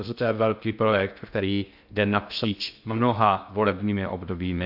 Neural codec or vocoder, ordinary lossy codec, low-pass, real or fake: codec, 16 kHz in and 24 kHz out, 0.6 kbps, FocalCodec, streaming, 2048 codes; AAC, 48 kbps; 5.4 kHz; fake